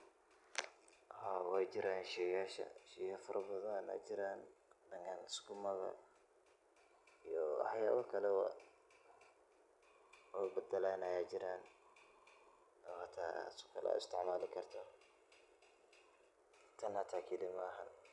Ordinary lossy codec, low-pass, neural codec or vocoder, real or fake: none; 10.8 kHz; none; real